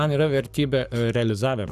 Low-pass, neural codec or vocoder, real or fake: 14.4 kHz; codec, 44.1 kHz, 7.8 kbps, Pupu-Codec; fake